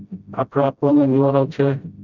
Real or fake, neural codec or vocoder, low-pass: fake; codec, 16 kHz, 0.5 kbps, FreqCodec, smaller model; 7.2 kHz